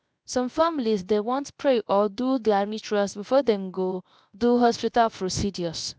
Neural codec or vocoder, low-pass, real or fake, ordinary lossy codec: codec, 16 kHz, 0.3 kbps, FocalCodec; none; fake; none